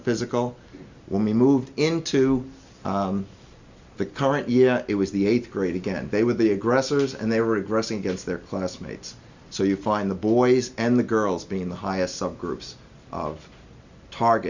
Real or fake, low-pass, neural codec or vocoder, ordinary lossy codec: real; 7.2 kHz; none; Opus, 64 kbps